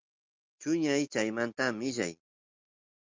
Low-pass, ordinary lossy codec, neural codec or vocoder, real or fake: 7.2 kHz; Opus, 32 kbps; none; real